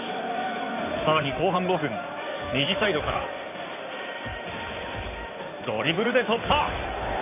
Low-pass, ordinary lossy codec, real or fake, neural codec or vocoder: 3.6 kHz; none; fake; codec, 16 kHz in and 24 kHz out, 2.2 kbps, FireRedTTS-2 codec